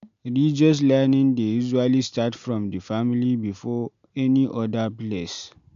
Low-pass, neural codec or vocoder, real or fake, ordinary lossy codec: 7.2 kHz; none; real; MP3, 64 kbps